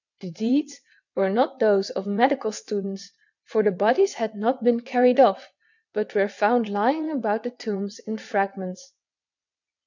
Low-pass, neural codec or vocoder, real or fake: 7.2 kHz; vocoder, 22.05 kHz, 80 mel bands, WaveNeXt; fake